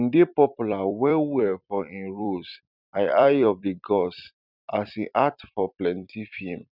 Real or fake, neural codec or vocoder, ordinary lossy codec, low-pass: fake; vocoder, 44.1 kHz, 128 mel bands every 256 samples, BigVGAN v2; none; 5.4 kHz